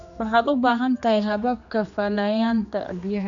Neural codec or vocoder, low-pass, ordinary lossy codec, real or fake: codec, 16 kHz, 2 kbps, X-Codec, HuBERT features, trained on general audio; 7.2 kHz; none; fake